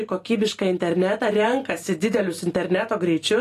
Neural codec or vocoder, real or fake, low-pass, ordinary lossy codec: none; real; 14.4 kHz; AAC, 48 kbps